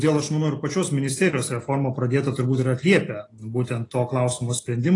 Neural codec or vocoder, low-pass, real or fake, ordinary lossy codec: none; 10.8 kHz; real; AAC, 32 kbps